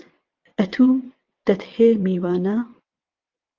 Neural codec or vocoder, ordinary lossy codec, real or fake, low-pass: vocoder, 22.05 kHz, 80 mel bands, WaveNeXt; Opus, 24 kbps; fake; 7.2 kHz